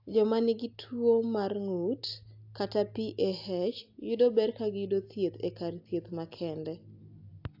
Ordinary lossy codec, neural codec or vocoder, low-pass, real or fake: AAC, 48 kbps; none; 5.4 kHz; real